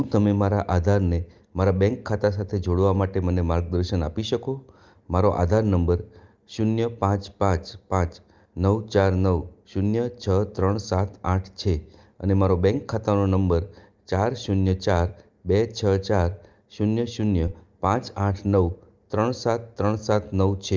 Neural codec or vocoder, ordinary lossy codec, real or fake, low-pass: none; Opus, 24 kbps; real; 7.2 kHz